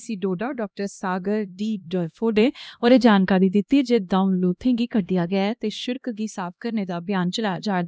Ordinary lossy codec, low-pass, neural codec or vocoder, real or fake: none; none; codec, 16 kHz, 1 kbps, X-Codec, HuBERT features, trained on LibriSpeech; fake